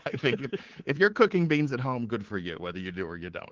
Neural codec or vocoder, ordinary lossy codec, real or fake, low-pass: codec, 24 kHz, 3.1 kbps, DualCodec; Opus, 16 kbps; fake; 7.2 kHz